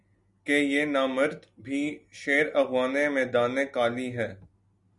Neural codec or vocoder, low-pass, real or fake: none; 10.8 kHz; real